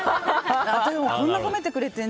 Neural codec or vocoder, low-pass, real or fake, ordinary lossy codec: none; none; real; none